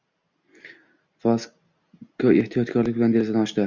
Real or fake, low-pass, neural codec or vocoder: real; 7.2 kHz; none